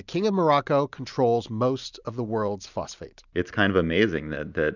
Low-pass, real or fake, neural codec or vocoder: 7.2 kHz; real; none